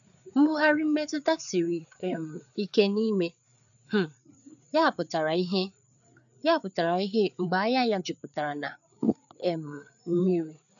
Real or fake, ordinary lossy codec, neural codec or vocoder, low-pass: fake; none; codec, 16 kHz, 4 kbps, FreqCodec, larger model; 7.2 kHz